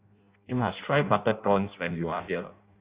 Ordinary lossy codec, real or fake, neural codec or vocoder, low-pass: Opus, 64 kbps; fake; codec, 16 kHz in and 24 kHz out, 0.6 kbps, FireRedTTS-2 codec; 3.6 kHz